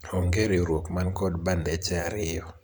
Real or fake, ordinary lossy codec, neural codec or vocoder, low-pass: fake; none; vocoder, 44.1 kHz, 128 mel bands every 512 samples, BigVGAN v2; none